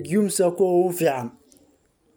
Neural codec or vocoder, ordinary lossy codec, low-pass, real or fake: none; none; none; real